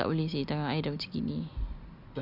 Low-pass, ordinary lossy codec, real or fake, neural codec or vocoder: 5.4 kHz; Opus, 64 kbps; real; none